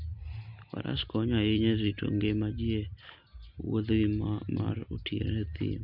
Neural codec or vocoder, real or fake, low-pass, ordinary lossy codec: none; real; 5.4 kHz; MP3, 48 kbps